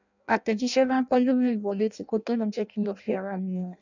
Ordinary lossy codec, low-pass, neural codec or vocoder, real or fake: none; 7.2 kHz; codec, 16 kHz in and 24 kHz out, 0.6 kbps, FireRedTTS-2 codec; fake